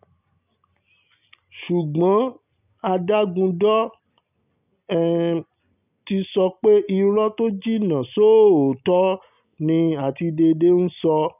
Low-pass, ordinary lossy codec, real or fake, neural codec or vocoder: 3.6 kHz; none; real; none